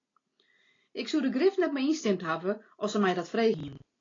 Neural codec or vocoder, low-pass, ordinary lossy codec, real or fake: none; 7.2 kHz; AAC, 32 kbps; real